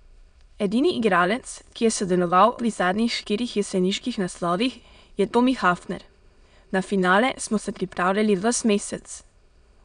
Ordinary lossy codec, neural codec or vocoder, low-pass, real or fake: none; autoencoder, 22.05 kHz, a latent of 192 numbers a frame, VITS, trained on many speakers; 9.9 kHz; fake